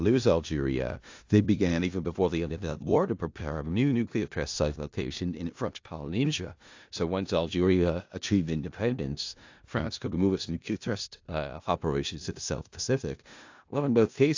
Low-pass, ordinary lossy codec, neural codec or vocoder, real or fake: 7.2 kHz; AAC, 48 kbps; codec, 16 kHz in and 24 kHz out, 0.4 kbps, LongCat-Audio-Codec, four codebook decoder; fake